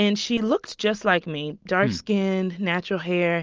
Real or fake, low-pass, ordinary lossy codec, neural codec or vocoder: real; 7.2 kHz; Opus, 24 kbps; none